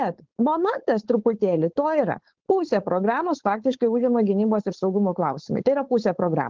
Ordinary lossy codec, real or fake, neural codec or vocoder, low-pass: Opus, 16 kbps; fake; codec, 16 kHz, 4.8 kbps, FACodec; 7.2 kHz